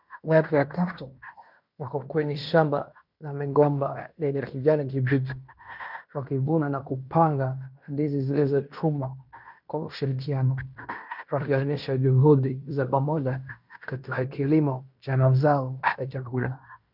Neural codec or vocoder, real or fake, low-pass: codec, 16 kHz in and 24 kHz out, 0.9 kbps, LongCat-Audio-Codec, fine tuned four codebook decoder; fake; 5.4 kHz